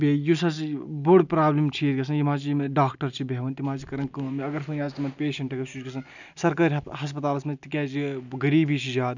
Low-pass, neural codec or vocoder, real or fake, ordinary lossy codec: 7.2 kHz; none; real; none